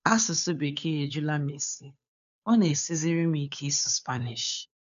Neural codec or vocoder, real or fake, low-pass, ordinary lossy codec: codec, 16 kHz, 2 kbps, FunCodec, trained on Chinese and English, 25 frames a second; fake; 7.2 kHz; none